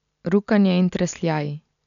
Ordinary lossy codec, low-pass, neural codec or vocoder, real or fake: none; 7.2 kHz; none; real